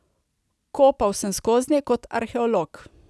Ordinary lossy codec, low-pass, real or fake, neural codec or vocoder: none; none; real; none